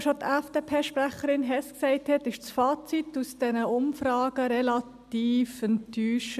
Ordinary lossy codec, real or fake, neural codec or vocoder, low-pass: none; real; none; 14.4 kHz